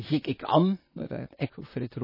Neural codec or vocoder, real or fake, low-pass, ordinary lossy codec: vocoder, 44.1 kHz, 128 mel bands, Pupu-Vocoder; fake; 5.4 kHz; MP3, 24 kbps